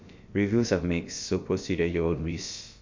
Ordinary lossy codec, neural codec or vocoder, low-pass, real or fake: MP3, 48 kbps; codec, 16 kHz, about 1 kbps, DyCAST, with the encoder's durations; 7.2 kHz; fake